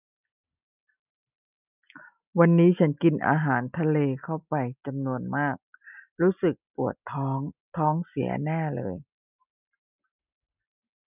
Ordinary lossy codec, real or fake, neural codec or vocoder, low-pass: none; real; none; 3.6 kHz